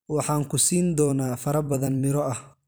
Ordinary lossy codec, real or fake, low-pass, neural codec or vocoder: none; fake; none; vocoder, 44.1 kHz, 128 mel bands every 256 samples, BigVGAN v2